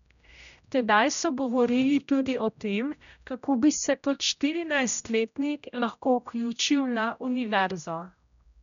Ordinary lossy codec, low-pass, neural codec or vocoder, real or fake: none; 7.2 kHz; codec, 16 kHz, 0.5 kbps, X-Codec, HuBERT features, trained on general audio; fake